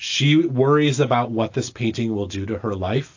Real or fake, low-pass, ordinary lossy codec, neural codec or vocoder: real; 7.2 kHz; AAC, 48 kbps; none